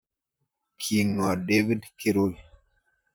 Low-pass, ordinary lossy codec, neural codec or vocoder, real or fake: none; none; vocoder, 44.1 kHz, 128 mel bands, Pupu-Vocoder; fake